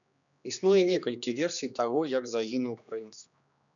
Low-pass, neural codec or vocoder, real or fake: 7.2 kHz; codec, 16 kHz, 2 kbps, X-Codec, HuBERT features, trained on general audio; fake